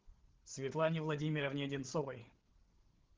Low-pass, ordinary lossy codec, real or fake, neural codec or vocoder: 7.2 kHz; Opus, 16 kbps; fake; codec, 16 kHz, 8 kbps, FreqCodec, larger model